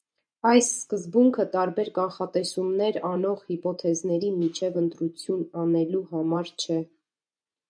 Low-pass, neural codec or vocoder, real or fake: 9.9 kHz; vocoder, 24 kHz, 100 mel bands, Vocos; fake